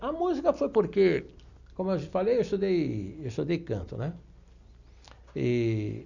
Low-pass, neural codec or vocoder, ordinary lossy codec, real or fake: 7.2 kHz; none; none; real